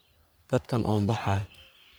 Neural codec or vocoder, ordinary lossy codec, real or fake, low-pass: codec, 44.1 kHz, 3.4 kbps, Pupu-Codec; none; fake; none